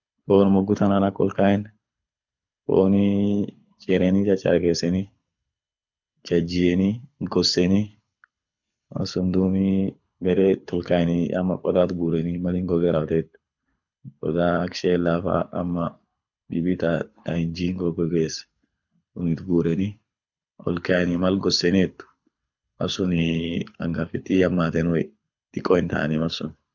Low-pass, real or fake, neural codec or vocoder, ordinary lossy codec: 7.2 kHz; fake; codec, 24 kHz, 6 kbps, HILCodec; none